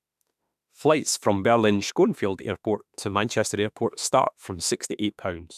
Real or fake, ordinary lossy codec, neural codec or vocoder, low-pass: fake; none; autoencoder, 48 kHz, 32 numbers a frame, DAC-VAE, trained on Japanese speech; 14.4 kHz